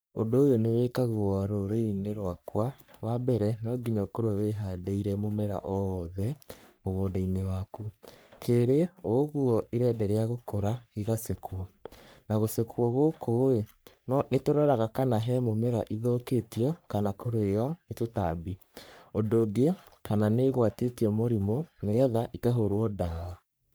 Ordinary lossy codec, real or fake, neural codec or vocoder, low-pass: none; fake; codec, 44.1 kHz, 3.4 kbps, Pupu-Codec; none